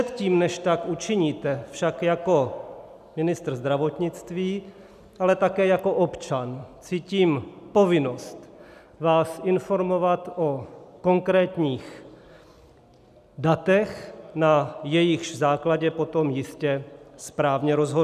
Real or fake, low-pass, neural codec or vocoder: real; 14.4 kHz; none